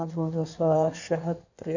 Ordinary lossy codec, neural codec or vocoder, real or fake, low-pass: none; codec, 16 kHz in and 24 kHz out, 1.1 kbps, FireRedTTS-2 codec; fake; 7.2 kHz